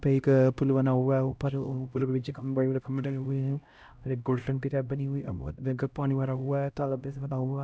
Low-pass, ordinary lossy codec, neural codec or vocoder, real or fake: none; none; codec, 16 kHz, 0.5 kbps, X-Codec, HuBERT features, trained on LibriSpeech; fake